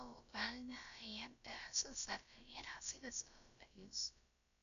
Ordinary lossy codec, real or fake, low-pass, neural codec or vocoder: none; fake; 7.2 kHz; codec, 16 kHz, about 1 kbps, DyCAST, with the encoder's durations